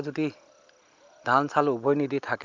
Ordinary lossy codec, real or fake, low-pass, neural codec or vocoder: Opus, 32 kbps; real; 7.2 kHz; none